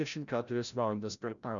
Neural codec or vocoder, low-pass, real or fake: codec, 16 kHz, 0.5 kbps, FreqCodec, larger model; 7.2 kHz; fake